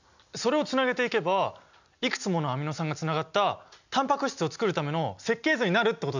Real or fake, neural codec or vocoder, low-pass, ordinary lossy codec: real; none; 7.2 kHz; none